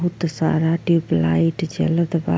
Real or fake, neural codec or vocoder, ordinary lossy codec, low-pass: real; none; none; none